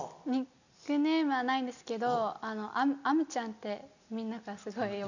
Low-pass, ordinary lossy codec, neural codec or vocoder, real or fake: 7.2 kHz; none; none; real